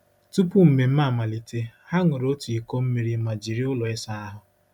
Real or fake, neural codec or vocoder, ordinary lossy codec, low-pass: real; none; none; 19.8 kHz